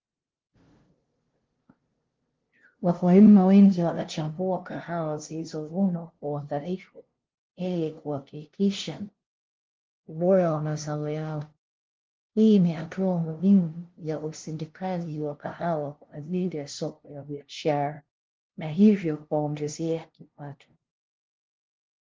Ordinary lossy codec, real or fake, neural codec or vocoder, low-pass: Opus, 16 kbps; fake; codec, 16 kHz, 0.5 kbps, FunCodec, trained on LibriTTS, 25 frames a second; 7.2 kHz